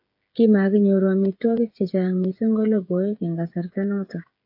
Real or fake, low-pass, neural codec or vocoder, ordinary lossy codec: fake; 5.4 kHz; codec, 16 kHz, 8 kbps, FreqCodec, smaller model; AAC, 48 kbps